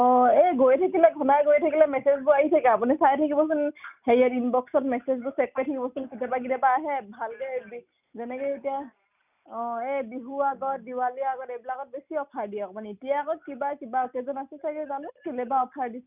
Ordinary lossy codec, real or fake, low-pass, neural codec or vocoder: none; real; 3.6 kHz; none